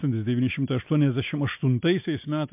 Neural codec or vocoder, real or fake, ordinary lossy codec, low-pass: none; real; MP3, 32 kbps; 3.6 kHz